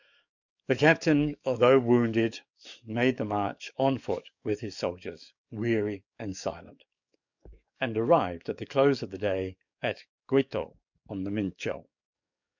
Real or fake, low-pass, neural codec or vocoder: fake; 7.2 kHz; codec, 44.1 kHz, 7.8 kbps, DAC